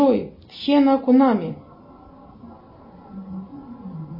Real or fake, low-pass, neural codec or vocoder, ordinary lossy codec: real; 5.4 kHz; none; MP3, 24 kbps